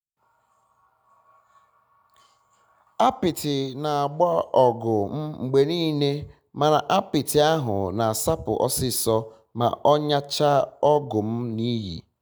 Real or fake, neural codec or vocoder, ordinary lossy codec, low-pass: real; none; none; none